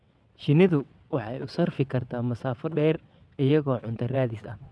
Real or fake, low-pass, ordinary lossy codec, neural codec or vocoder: fake; none; none; vocoder, 22.05 kHz, 80 mel bands, WaveNeXt